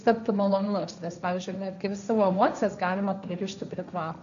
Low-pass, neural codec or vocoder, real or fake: 7.2 kHz; codec, 16 kHz, 1.1 kbps, Voila-Tokenizer; fake